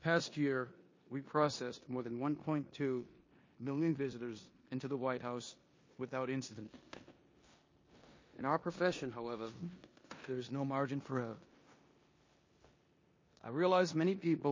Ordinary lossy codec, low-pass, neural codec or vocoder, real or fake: MP3, 32 kbps; 7.2 kHz; codec, 16 kHz in and 24 kHz out, 0.9 kbps, LongCat-Audio-Codec, four codebook decoder; fake